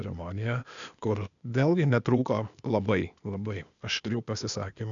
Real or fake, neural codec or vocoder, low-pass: fake; codec, 16 kHz, 0.8 kbps, ZipCodec; 7.2 kHz